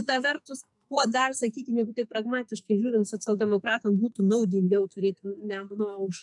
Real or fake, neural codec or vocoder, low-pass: fake; codec, 44.1 kHz, 2.6 kbps, SNAC; 10.8 kHz